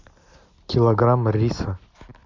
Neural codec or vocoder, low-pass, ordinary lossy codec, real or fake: none; 7.2 kHz; MP3, 64 kbps; real